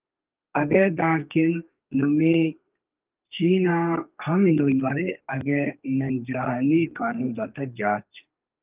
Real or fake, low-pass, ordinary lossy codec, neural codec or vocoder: fake; 3.6 kHz; Opus, 24 kbps; codec, 32 kHz, 1.9 kbps, SNAC